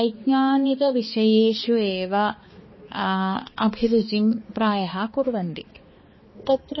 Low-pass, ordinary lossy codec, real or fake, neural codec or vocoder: 7.2 kHz; MP3, 24 kbps; fake; codec, 16 kHz, 2 kbps, X-Codec, HuBERT features, trained on balanced general audio